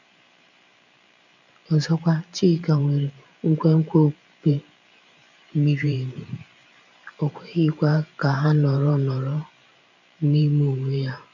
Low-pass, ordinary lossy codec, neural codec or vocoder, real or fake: 7.2 kHz; none; vocoder, 44.1 kHz, 128 mel bands every 512 samples, BigVGAN v2; fake